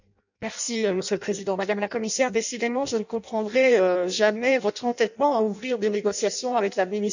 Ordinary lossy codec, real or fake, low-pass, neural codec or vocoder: none; fake; 7.2 kHz; codec, 16 kHz in and 24 kHz out, 0.6 kbps, FireRedTTS-2 codec